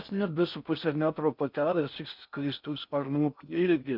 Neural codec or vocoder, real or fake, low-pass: codec, 16 kHz in and 24 kHz out, 0.6 kbps, FocalCodec, streaming, 4096 codes; fake; 5.4 kHz